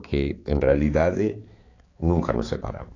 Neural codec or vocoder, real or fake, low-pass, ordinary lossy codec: codec, 16 kHz, 4 kbps, X-Codec, HuBERT features, trained on balanced general audio; fake; 7.2 kHz; AAC, 32 kbps